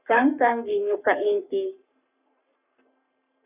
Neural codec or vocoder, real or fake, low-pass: codec, 44.1 kHz, 3.4 kbps, Pupu-Codec; fake; 3.6 kHz